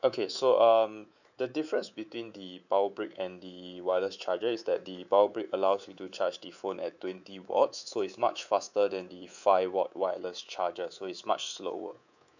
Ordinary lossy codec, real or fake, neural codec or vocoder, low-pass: none; fake; codec, 24 kHz, 3.1 kbps, DualCodec; 7.2 kHz